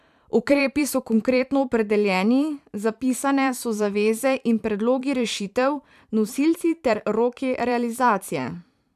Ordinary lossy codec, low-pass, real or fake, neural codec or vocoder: none; 14.4 kHz; fake; vocoder, 44.1 kHz, 128 mel bands every 512 samples, BigVGAN v2